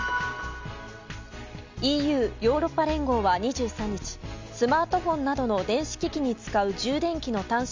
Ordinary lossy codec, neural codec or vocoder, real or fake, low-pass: MP3, 64 kbps; none; real; 7.2 kHz